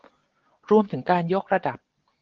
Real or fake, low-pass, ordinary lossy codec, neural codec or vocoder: real; 7.2 kHz; Opus, 16 kbps; none